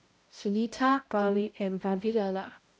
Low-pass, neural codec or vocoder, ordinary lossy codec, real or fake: none; codec, 16 kHz, 0.5 kbps, X-Codec, HuBERT features, trained on balanced general audio; none; fake